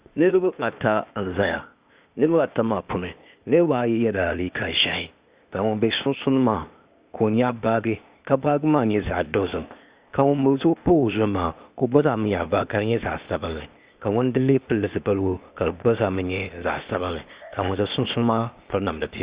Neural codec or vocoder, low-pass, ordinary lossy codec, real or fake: codec, 16 kHz, 0.8 kbps, ZipCodec; 3.6 kHz; Opus, 64 kbps; fake